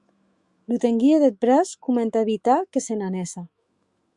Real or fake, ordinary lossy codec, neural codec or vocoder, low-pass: fake; Opus, 64 kbps; autoencoder, 48 kHz, 128 numbers a frame, DAC-VAE, trained on Japanese speech; 10.8 kHz